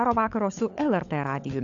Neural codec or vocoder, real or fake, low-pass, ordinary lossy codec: codec, 16 kHz, 16 kbps, FunCodec, trained on LibriTTS, 50 frames a second; fake; 7.2 kHz; AAC, 64 kbps